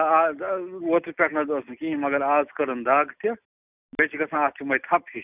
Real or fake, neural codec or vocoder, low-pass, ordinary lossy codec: real; none; 3.6 kHz; none